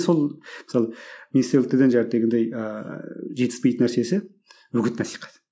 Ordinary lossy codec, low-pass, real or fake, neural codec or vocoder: none; none; real; none